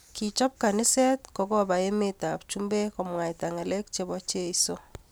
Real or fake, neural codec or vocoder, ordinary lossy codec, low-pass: real; none; none; none